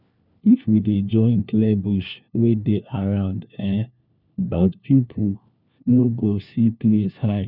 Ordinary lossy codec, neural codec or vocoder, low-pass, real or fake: none; codec, 16 kHz, 1 kbps, FunCodec, trained on LibriTTS, 50 frames a second; 5.4 kHz; fake